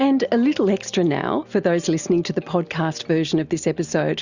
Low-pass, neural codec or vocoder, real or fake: 7.2 kHz; none; real